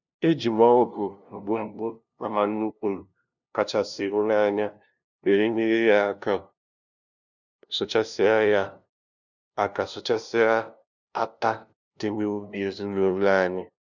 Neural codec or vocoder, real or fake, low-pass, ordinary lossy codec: codec, 16 kHz, 0.5 kbps, FunCodec, trained on LibriTTS, 25 frames a second; fake; 7.2 kHz; none